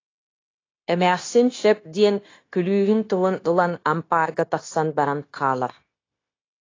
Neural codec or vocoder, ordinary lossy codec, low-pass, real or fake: codec, 16 kHz, 0.9 kbps, LongCat-Audio-Codec; AAC, 32 kbps; 7.2 kHz; fake